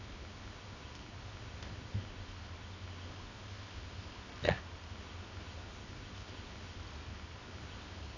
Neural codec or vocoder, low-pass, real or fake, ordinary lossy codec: codec, 24 kHz, 0.9 kbps, WavTokenizer, medium music audio release; 7.2 kHz; fake; none